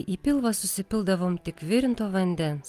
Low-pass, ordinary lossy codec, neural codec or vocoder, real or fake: 14.4 kHz; Opus, 24 kbps; none; real